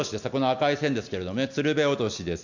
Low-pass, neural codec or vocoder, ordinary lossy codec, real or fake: 7.2 kHz; none; none; real